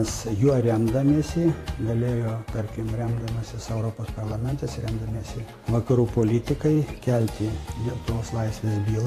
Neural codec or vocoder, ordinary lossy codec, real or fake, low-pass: vocoder, 44.1 kHz, 128 mel bands every 512 samples, BigVGAN v2; AAC, 48 kbps; fake; 14.4 kHz